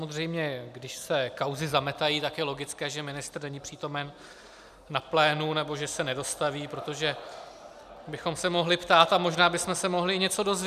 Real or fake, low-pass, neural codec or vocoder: real; 14.4 kHz; none